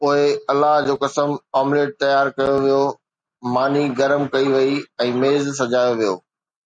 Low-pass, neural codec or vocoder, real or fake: 9.9 kHz; none; real